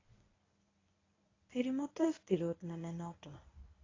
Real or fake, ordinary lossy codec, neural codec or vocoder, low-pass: fake; AAC, 32 kbps; codec, 24 kHz, 0.9 kbps, WavTokenizer, medium speech release version 1; 7.2 kHz